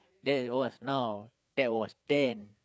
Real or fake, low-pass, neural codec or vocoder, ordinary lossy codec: fake; none; codec, 16 kHz, 4 kbps, FreqCodec, larger model; none